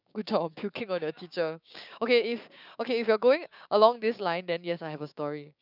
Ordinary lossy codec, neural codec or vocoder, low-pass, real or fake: none; codec, 16 kHz, 6 kbps, DAC; 5.4 kHz; fake